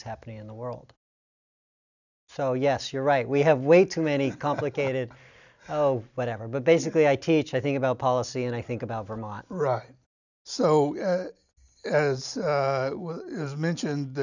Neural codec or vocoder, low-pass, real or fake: none; 7.2 kHz; real